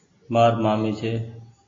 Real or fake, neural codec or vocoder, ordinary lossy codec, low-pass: real; none; AAC, 32 kbps; 7.2 kHz